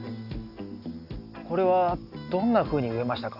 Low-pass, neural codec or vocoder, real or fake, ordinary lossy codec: 5.4 kHz; none; real; none